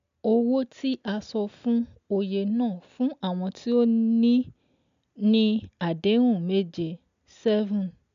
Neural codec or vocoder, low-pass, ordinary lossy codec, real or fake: none; 7.2 kHz; MP3, 64 kbps; real